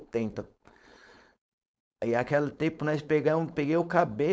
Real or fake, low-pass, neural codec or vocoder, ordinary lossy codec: fake; none; codec, 16 kHz, 4.8 kbps, FACodec; none